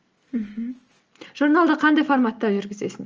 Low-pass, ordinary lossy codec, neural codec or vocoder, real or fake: 7.2 kHz; Opus, 24 kbps; none; real